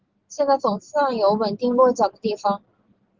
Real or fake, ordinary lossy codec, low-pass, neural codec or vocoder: real; Opus, 16 kbps; 7.2 kHz; none